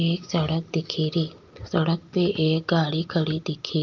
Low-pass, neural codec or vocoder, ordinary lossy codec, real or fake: 7.2 kHz; none; Opus, 24 kbps; real